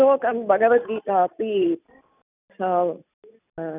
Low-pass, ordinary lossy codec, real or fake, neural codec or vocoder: 3.6 kHz; none; real; none